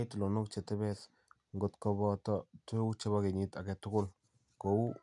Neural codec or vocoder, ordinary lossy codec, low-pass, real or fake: none; none; 10.8 kHz; real